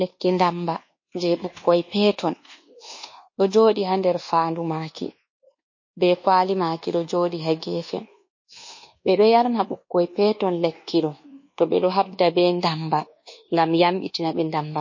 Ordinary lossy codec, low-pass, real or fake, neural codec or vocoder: MP3, 32 kbps; 7.2 kHz; fake; codec, 24 kHz, 1.2 kbps, DualCodec